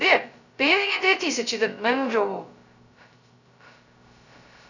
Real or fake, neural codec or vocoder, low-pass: fake; codec, 16 kHz, 0.2 kbps, FocalCodec; 7.2 kHz